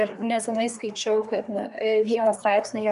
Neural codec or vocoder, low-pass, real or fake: codec, 24 kHz, 1 kbps, SNAC; 10.8 kHz; fake